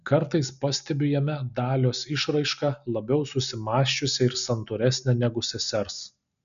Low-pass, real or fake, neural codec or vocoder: 7.2 kHz; real; none